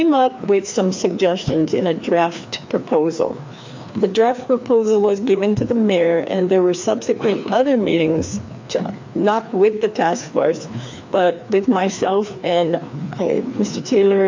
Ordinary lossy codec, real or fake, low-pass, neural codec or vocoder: MP3, 48 kbps; fake; 7.2 kHz; codec, 16 kHz, 2 kbps, FreqCodec, larger model